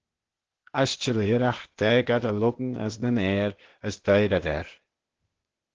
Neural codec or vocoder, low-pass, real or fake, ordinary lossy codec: codec, 16 kHz, 0.8 kbps, ZipCodec; 7.2 kHz; fake; Opus, 16 kbps